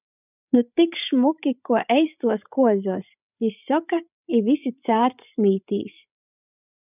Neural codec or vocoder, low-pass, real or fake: codec, 16 kHz, 16 kbps, FreqCodec, larger model; 3.6 kHz; fake